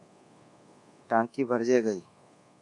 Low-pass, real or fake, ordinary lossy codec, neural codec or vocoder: 10.8 kHz; fake; AAC, 64 kbps; codec, 24 kHz, 1.2 kbps, DualCodec